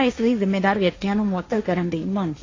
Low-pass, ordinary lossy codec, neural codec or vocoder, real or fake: 7.2 kHz; AAC, 32 kbps; codec, 16 kHz, 1.1 kbps, Voila-Tokenizer; fake